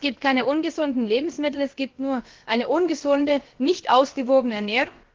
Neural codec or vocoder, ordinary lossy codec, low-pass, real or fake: codec, 16 kHz, about 1 kbps, DyCAST, with the encoder's durations; Opus, 16 kbps; 7.2 kHz; fake